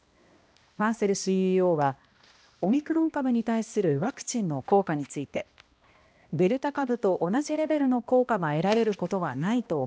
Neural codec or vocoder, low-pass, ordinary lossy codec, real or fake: codec, 16 kHz, 1 kbps, X-Codec, HuBERT features, trained on balanced general audio; none; none; fake